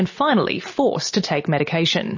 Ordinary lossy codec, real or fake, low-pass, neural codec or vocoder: MP3, 32 kbps; real; 7.2 kHz; none